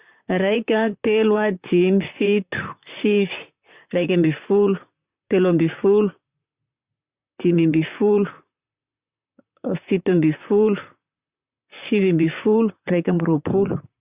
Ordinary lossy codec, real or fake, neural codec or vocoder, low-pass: Opus, 64 kbps; fake; vocoder, 44.1 kHz, 128 mel bands, Pupu-Vocoder; 3.6 kHz